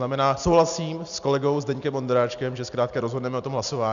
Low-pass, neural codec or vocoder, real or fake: 7.2 kHz; none; real